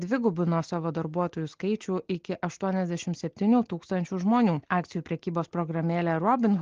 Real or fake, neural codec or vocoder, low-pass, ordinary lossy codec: real; none; 7.2 kHz; Opus, 16 kbps